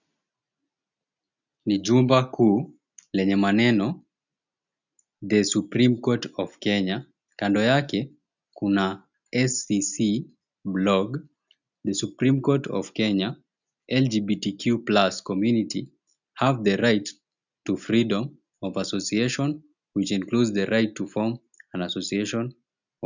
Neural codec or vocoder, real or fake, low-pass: none; real; 7.2 kHz